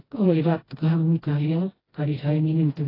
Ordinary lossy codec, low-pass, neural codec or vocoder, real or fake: AAC, 24 kbps; 5.4 kHz; codec, 16 kHz, 1 kbps, FreqCodec, smaller model; fake